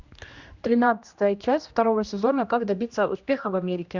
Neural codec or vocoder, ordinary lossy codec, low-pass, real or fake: codec, 16 kHz, 1 kbps, X-Codec, HuBERT features, trained on general audio; Opus, 64 kbps; 7.2 kHz; fake